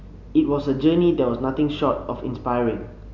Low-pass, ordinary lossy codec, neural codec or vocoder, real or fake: 7.2 kHz; none; none; real